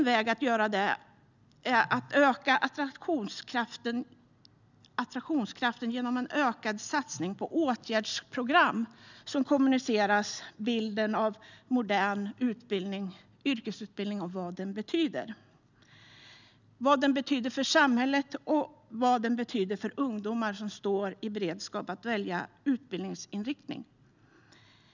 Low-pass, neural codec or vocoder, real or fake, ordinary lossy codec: 7.2 kHz; none; real; none